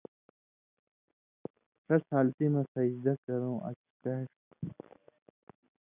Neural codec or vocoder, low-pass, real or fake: none; 3.6 kHz; real